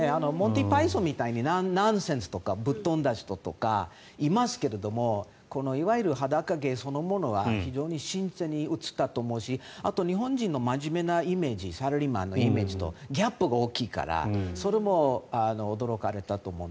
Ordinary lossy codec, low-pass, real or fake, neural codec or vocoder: none; none; real; none